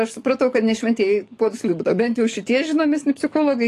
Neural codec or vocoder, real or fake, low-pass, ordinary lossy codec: codec, 44.1 kHz, 7.8 kbps, DAC; fake; 14.4 kHz; AAC, 48 kbps